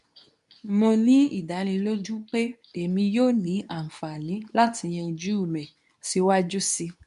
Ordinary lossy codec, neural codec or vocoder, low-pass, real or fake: none; codec, 24 kHz, 0.9 kbps, WavTokenizer, medium speech release version 2; 10.8 kHz; fake